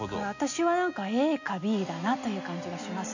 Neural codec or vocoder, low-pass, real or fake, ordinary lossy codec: none; 7.2 kHz; real; none